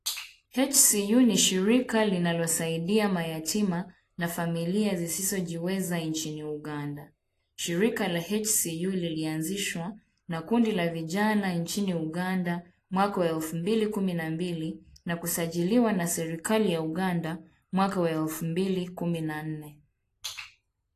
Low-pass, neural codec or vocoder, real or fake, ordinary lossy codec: 14.4 kHz; none; real; AAC, 48 kbps